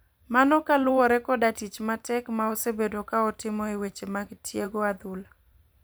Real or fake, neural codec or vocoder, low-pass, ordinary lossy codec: fake; vocoder, 44.1 kHz, 128 mel bands every 512 samples, BigVGAN v2; none; none